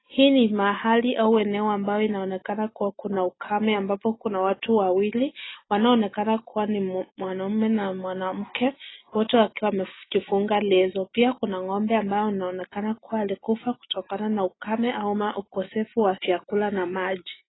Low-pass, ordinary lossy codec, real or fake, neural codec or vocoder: 7.2 kHz; AAC, 16 kbps; real; none